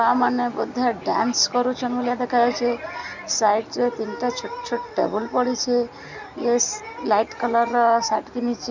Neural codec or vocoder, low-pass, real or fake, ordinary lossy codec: none; 7.2 kHz; real; none